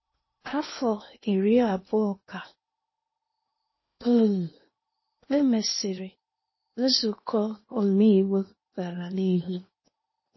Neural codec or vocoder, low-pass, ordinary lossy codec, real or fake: codec, 16 kHz in and 24 kHz out, 0.8 kbps, FocalCodec, streaming, 65536 codes; 7.2 kHz; MP3, 24 kbps; fake